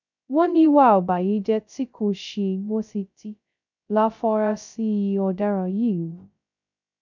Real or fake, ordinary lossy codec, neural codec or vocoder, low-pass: fake; none; codec, 16 kHz, 0.2 kbps, FocalCodec; 7.2 kHz